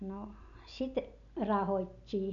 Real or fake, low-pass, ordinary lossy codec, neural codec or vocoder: real; 7.2 kHz; none; none